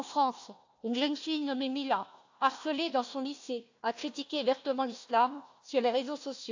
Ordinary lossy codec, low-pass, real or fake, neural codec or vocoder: AAC, 48 kbps; 7.2 kHz; fake; codec, 16 kHz, 1 kbps, FunCodec, trained on Chinese and English, 50 frames a second